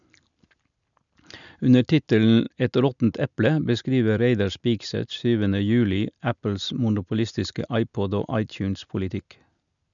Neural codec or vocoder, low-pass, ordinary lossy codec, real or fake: none; 7.2 kHz; none; real